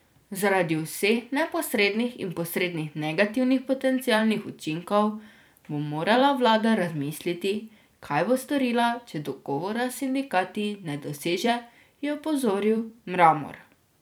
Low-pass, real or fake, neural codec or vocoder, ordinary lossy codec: none; fake; vocoder, 44.1 kHz, 128 mel bands every 256 samples, BigVGAN v2; none